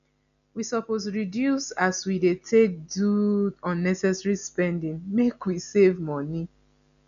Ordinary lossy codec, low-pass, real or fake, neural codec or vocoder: none; 7.2 kHz; real; none